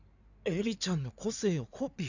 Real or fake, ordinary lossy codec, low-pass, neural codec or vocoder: fake; none; 7.2 kHz; codec, 16 kHz in and 24 kHz out, 2.2 kbps, FireRedTTS-2 codec